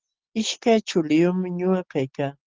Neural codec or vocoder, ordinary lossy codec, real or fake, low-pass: none; Opus, 16 kbps; real; 7.2 kHz